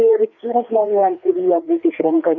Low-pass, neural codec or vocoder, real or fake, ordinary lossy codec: 7.2 kHz; codec, 16 kHz, 2 kbps, FreqCodec, larger model; fake; MP3, 48 kbps